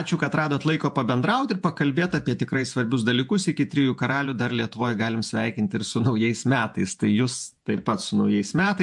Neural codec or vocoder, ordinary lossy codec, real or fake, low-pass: vocoder, 48 kHz, 128 mel bands, Vocos; MP3, 64 kbps; fake; 10.8 kHz